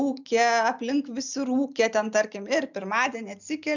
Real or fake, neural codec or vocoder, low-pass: real; none; 7.2 kHz